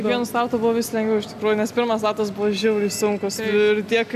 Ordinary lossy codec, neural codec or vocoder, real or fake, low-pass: MP3, 96 kbps; none; real; 14.4 kHz